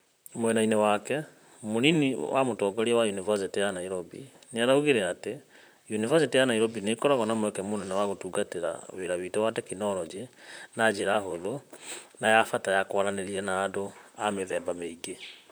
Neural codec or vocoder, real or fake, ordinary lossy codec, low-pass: vocoder, 44.1 kHz, 128 mel bands, Pupu-Vocoder; fake; none; none